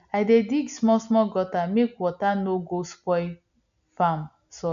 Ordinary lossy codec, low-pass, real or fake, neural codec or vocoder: none; 7.2 kHz; real; none